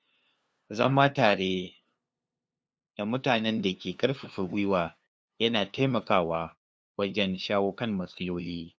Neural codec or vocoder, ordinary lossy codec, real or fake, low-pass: codec, 16 kHz, 2 kbps, FunCodec, trained on LibriTTS, 25 frames a second; none; fake; none